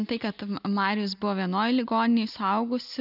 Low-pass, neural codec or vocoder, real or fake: 5.4 kHz; none; real